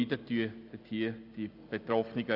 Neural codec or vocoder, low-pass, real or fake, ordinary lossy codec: none; 5.4 kHz; real; AAC, 48 kbps